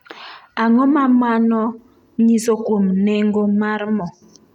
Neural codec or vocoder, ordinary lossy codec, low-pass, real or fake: none; none; 19.8 kHz; real